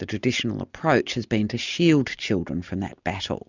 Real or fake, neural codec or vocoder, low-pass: real; none; 7.2 kHz